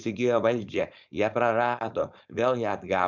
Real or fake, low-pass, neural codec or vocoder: fake; 7.2 kHz; codec, 16 kHz, 4.8 kbps, FACodec